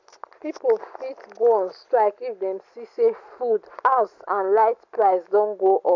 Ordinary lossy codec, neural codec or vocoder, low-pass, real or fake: AAC, 48 kbps; none; 7.2 kHz; real